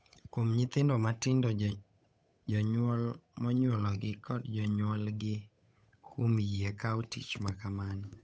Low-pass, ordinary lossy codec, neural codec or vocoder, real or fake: none; none; codec, 16 kHz, 8 kbps, FunCodec, trained on Chinese and English, 25 frames a second; fake